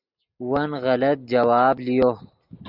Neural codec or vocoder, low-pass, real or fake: none; 5.4 kHz; real